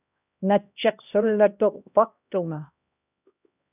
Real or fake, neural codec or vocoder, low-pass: fake; codec, 16 kHz, 1 kbps, X-Codec, HuBERT features, trained on LibriSpeech; 3.6 kHz